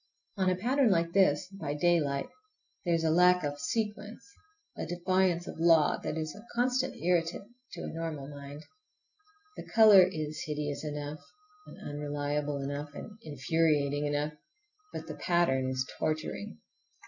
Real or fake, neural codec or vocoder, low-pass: real; none; 7.2 kHz